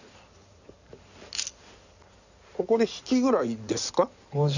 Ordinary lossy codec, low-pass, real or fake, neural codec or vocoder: none; 7.2 kHz; fake; codec, 16 kHz in and 24 kHz out, 2.2 kbps, FireRedTTS-2 codec